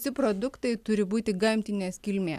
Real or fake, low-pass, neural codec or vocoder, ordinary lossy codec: real; 14.4 kHz; none; MP3, 96 kbps